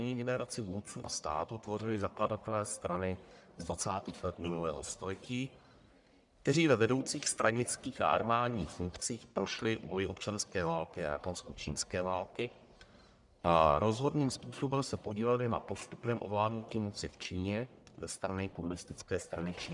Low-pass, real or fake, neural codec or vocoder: 10.8 kHz; fake; codec, 44.1 kHz, 1.7 kbps, Pupu-Codec